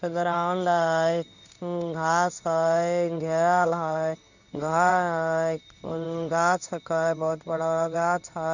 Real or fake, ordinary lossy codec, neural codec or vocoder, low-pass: fake; none; codec, 16 kHz in and 24 kHz out, 1 kbps, XY-Tokenizer; 7.2 kHz